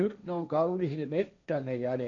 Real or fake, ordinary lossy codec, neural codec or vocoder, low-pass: fake; none; codec, 16 kHz, 1.1 kbps, Voila-Tokenizer; 7.2 kHz